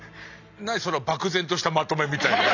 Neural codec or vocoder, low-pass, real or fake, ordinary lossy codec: none; 7.2 kHz; real; none